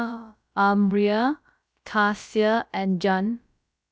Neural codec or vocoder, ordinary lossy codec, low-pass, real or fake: codec, 16 kHz, about 1 kbps, DyCAST, with the encoder's durations; none; none; fake